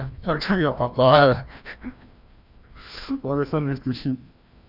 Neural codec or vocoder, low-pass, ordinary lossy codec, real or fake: codec, 16 kHz, 1 kbps, FreqCodec, larger model; 5.4 kHz; none; fake